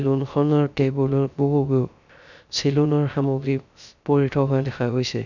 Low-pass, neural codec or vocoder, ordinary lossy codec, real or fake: 7.2 kHz; codec, 16 kHz, 0.3 kbps, FocalCodec; none; fake